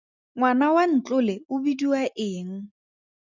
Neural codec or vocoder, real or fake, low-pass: none; real; 7.2 kHz